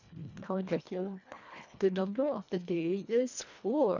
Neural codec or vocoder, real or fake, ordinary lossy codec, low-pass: codec, 24 kHz, 1.5 kbps, HILCodec; fake; none; 7.2 kHz